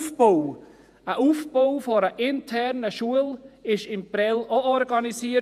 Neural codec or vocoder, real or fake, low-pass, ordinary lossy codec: vocoder, 48 kHz, 128 mel bands, Vocos; fake; 14.4 kHz; none